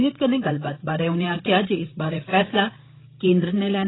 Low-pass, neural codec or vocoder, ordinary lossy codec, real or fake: 7.2 kHz; codec, 16 kHz, 8 kbps, FreqCodec, larger model; AAC, 16 kbps; fake